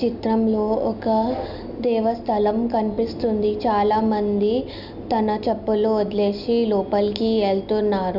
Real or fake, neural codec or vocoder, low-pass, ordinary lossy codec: real; none; 5.4 kHz; none